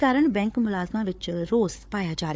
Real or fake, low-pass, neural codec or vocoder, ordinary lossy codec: fake; none; codec, 16 kHz, 4 kbps, FunCodec, trained on Chinese and English, 50 frames a second; none